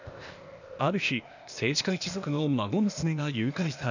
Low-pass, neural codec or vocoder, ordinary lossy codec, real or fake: 7.2 kHz; codec, 16 kHz, 0.8 kbps, ZipCodec; none; fake